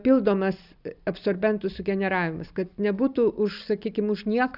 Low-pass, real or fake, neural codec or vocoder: 5.4 kHz; real; none